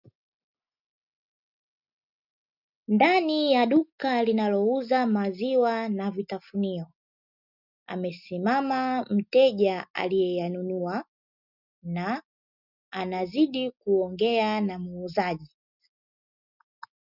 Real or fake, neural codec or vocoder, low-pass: real; none; 5.4 kHz